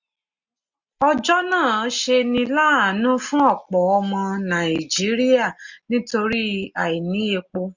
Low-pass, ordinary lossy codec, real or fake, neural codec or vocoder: 7.2 kHz; none; real; none